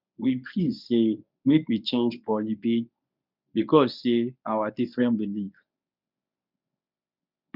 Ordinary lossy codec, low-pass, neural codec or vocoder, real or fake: none; 5.4 kHz; codec, 24 kHz, 0.9 kbps, WavTokenizer, medium speech release version 1; fake